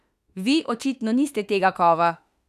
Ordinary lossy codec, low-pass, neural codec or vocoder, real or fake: none; 14.4 kHz; autoencoder, 48 kHz, 32 numbers a frame, DAC-VAE, trained on Japanese speech; fake